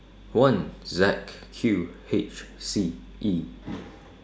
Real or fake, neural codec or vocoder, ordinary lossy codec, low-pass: real; none; none; none